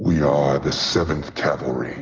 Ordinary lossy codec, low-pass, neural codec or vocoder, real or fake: Opus, 24 kbps; 7.2 kHz; none; real